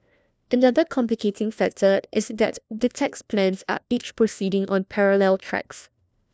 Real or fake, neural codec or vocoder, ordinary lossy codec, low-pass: fake; codec, 16 kHz, 1 kbps, FunCodec, trained on LibriTTS, 50 frames a second; none; none